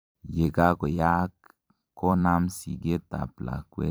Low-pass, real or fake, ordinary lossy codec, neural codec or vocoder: none; fake; none; vocoder, 44.1 kHz, 128 mel bands every 256 samples, BigVGAN v2